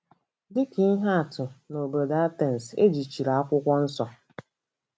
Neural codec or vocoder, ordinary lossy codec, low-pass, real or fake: none; none; none; real